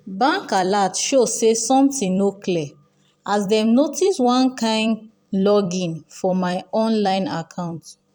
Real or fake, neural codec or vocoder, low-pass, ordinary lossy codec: fake; vocoder, 44.1 kHz, 128 mel bands every 256 samples, BigVGAN v2; 19.8 kHz; none